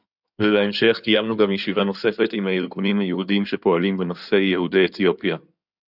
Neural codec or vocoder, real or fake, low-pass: codec, 16 kHz in and 24 kHz out, 2.2 kbps, FireRedTTS-2 codec; fake; 5.4 kHz